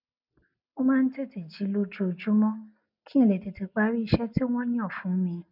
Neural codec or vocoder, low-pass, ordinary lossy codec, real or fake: none; 5.4 kHz; none; real